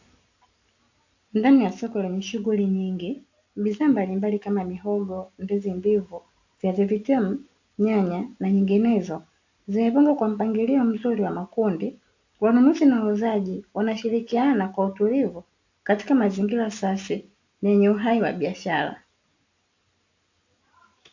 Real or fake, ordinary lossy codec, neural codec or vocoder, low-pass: real; AAC, 48 kbps; none; 7.2 kHz